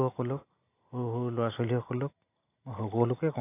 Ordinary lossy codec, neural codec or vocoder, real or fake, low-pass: AAC, 24 kbps; none; real; 3.6 kHz